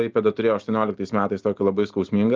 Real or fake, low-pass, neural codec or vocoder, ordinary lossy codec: real; 7.2 kHz; none; Opus, 24 kbps